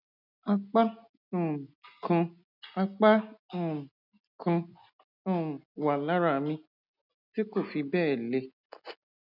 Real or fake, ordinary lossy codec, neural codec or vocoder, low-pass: real; none; none; 5.4 kHz